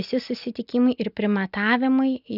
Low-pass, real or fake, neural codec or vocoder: 5.4 kHz; real; none